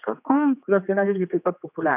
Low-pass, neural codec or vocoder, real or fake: 3.6 kHz; codec, 16 kHz, 2 kbps, X-Codec, HuBERT features, trained on general audio; fake